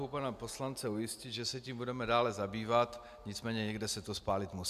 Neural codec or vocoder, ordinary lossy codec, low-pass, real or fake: none; MP3, 96 kbps; 14.4 kHz; real